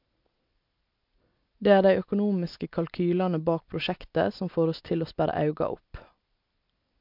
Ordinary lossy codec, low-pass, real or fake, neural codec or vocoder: MP3, 48 kbps; 5.4 kHz; real; none